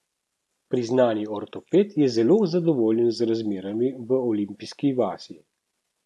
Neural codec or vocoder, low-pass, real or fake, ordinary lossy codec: none; none; real; none